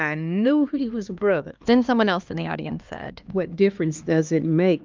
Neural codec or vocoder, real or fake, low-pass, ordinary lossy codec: codec, 16 kHz, 2 kbps, X-Codec, HuBERT features, trained on LibriSpeech; fake; 7.2 kHz; Opus, 24 kbps